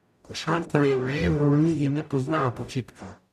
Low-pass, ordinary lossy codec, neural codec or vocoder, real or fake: 14.4 kHz; none; codec, 44.1 kHz, 0.9 kbps, DAC; fake